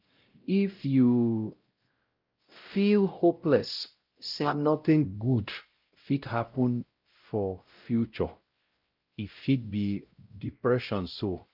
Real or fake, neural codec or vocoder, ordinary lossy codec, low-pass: fake; codec, 16 kHz, 0.5 kbps, X-Codec, WavLM features, trained on Multilingual LibriSpeech; Opus, 24 kbps; 5.4 kHz